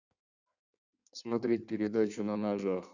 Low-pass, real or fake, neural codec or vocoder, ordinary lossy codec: 7.2 kHz; fake; codec, 16 kHz in and 24 kHz out, 1.1 kbps, FireRedTTS-2 codec; none